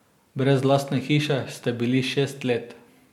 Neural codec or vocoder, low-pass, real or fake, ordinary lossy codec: none; 19.8 kHz; real; MP3, 96 kbps